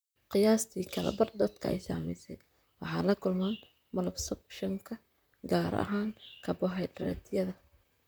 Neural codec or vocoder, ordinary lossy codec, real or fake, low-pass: vocoder, 44.1 kHz, 128 mel bands, Pupu-Vocoder; none; fake; none